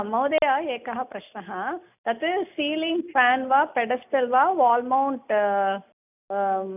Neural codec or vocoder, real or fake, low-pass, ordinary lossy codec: none; real; 3.6 kHz; none